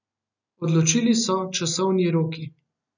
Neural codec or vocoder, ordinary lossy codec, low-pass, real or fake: none; none; 7.2 kHz; real